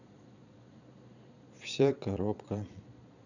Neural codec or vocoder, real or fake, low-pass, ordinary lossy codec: vocoder, 22.05 kHz, 80 mel bands, Vocos; fake; 7.2 kHz; none